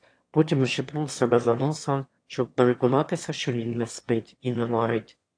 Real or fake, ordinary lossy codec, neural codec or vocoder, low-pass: fake; AAC, 48 kbps; autoencoder, 22.05 kHz, a latent of 192 numbers a frame, VITS, trained on one speaker; 9.9 kHz